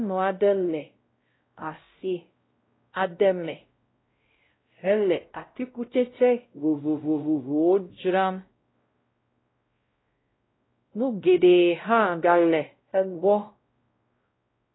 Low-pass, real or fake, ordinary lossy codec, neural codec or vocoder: 7.2 kHz; fake; AAC, 16 kbps; codec, 16 kHz, 0.5 kbps, X-Codec, WavLM features, trained on Multilingual LibriSpeech